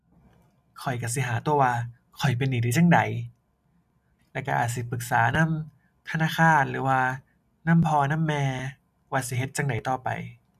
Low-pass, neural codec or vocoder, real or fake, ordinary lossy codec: 14.4 kHz; none; real; none